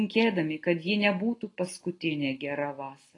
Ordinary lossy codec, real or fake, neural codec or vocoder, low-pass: AAC, 32 kbps; real; none; 10.8 kHz